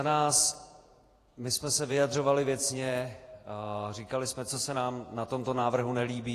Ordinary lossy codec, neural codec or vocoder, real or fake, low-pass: AAC, 48 kbps; vocoder, 48 kHz, 128 mel bands, Vocos; fake; 14.4 kHz